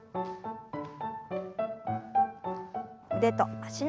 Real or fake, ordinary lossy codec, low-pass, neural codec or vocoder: real; none; none; none